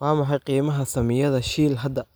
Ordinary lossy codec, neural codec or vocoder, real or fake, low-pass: none; none; real; none